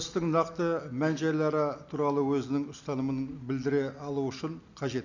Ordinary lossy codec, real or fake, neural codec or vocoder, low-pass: none; real; none; 7.2 kHz